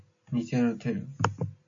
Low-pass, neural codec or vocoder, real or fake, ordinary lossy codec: 7.2 kHz; none; real; MP3, 48 kbps